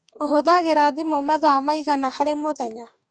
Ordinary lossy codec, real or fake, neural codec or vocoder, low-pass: none; fake; codec, 44.1 kHz, 2.6 kbps, DAC; 9.9 kHz